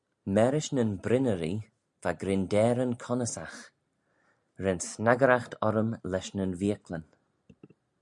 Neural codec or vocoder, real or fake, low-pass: none; real; 10.8 kHz